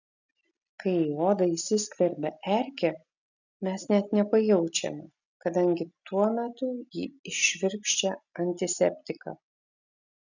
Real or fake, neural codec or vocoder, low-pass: real; none; 7.2 kHz